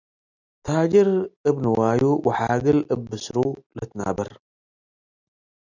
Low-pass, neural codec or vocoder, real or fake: 7.2 kHz; none; real